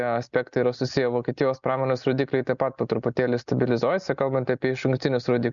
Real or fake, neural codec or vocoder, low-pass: real; none; 7.2 kHz